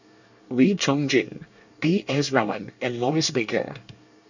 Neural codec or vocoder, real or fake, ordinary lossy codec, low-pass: codec, 24 kHz, 1 kbps, SNAC; fake; none; 7.2 kHz